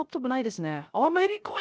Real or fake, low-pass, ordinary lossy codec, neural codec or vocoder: fake; none; none; codec, 16 kHz, 0.3 kbps, FocalCodec